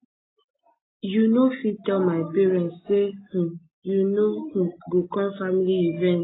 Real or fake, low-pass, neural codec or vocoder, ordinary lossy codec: real; 7.2 kHz; none; AAC, 16 kbps